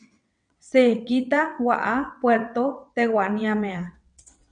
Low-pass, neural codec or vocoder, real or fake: 9.9 kHz; vocoder, 22.05 kHz, 80 mel bands, WaveNeXt; fake